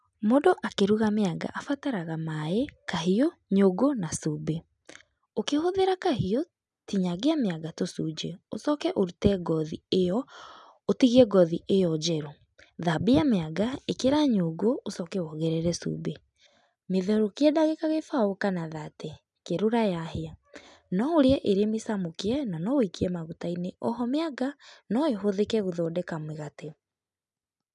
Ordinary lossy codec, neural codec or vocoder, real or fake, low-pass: none; none; real; 10.8 kHz